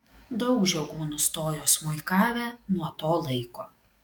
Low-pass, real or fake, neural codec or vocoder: 19.8 kHz; fake; codec, 44.1 kHz, 7.8 kbps, DAC